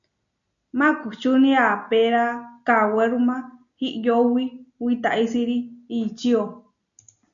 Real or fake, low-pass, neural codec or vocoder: real; 7.2 kHz; none